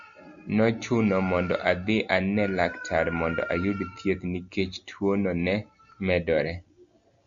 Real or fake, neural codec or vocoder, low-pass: real; none; 7.2 kHz